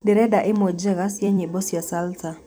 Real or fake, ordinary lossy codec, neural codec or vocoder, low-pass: fake; none; vocoder, 44.1 kHz, 128 mel bands every 256 samples, BigVGAN v2; none